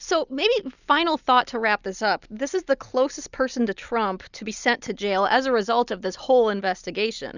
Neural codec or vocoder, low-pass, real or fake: none; 7.2 kHz; real